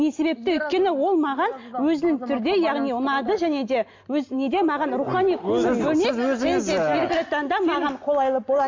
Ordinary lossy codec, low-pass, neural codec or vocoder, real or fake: MP3, 48 kbps; 7.2 kHz; none; real